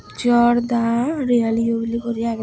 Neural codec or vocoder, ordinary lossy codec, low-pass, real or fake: none; none; none; real